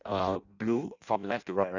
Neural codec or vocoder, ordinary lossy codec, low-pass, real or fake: codec, 16 kHz in and 24 kHz out, 0.6 kbps, FireRedTTS-2 codec; none; 7.2 kHz; fake